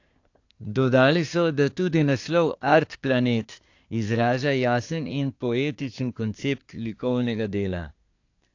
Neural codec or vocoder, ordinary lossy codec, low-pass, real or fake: codec, 24 kHz, 1 kbps, SNAC; AAC, 48 kbps; 7.2 kHz; fake